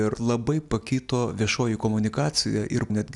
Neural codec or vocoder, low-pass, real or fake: none; 10.8 kHz; real